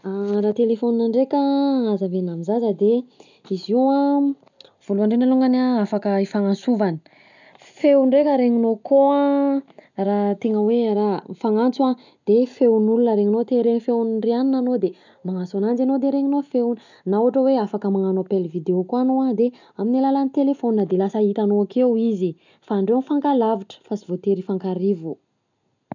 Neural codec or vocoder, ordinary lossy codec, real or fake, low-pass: none; none; real; 7.2 kHz